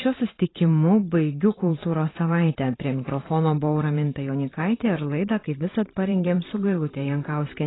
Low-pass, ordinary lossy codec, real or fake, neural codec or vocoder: 7.2 kHz; AAC, 16 kbps; fake; vocoder, 44.1 kHz, 128 mel bands every 512 samples, BigVGAN v2